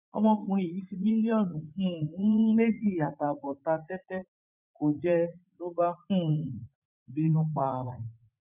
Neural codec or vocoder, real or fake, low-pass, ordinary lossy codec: vocoder, 44.1 kHz, 80 mel bands, Vocos; fake; 3.6 kHz; none